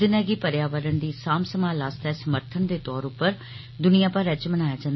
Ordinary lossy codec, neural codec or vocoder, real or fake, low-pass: MP3, 24 kbps; none; real; 7.2 kHz